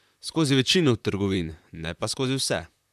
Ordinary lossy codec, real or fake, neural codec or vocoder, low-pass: none; fake; vocoder, 44.1 kHz, 128 mel bands, Pupu-Vocoder; 14.4 kHz